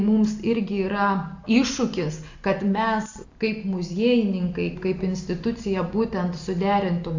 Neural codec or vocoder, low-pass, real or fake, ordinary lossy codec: none; 7.2 kHz; real; AAC, 48 kbps